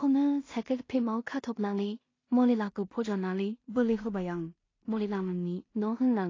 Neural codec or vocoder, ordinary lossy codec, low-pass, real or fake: codec, 16 kHz in and 24 kHz out, 0.4 kbps, LongCat-Audio-Codec, two codebook decoder; AAC, 32 kbps; 7.2 kHz; fake